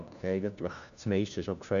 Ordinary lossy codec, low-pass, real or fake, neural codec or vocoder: none; 7.2 kHz; fake; codec, 16 kHz, 1 kbps, FunCodec, trained on LibriTTS, 50 frames a second